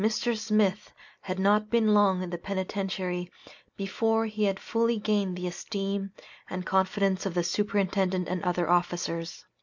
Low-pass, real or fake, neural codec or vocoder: 7.2 kHz; real; none